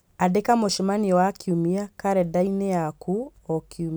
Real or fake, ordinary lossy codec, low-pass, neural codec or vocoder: real; none; none; none